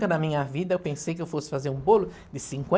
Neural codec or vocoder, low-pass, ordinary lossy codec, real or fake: none; none; none; real